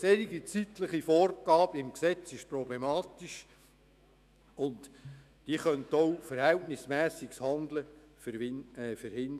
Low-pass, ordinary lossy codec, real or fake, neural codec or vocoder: 14.4 kHz; none; fake; autoencoder, 48 kHz, 128 numbers a frame, DAC-VAE, trained on Japanese speech